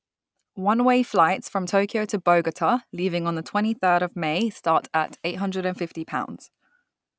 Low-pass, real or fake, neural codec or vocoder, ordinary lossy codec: none; real; none; none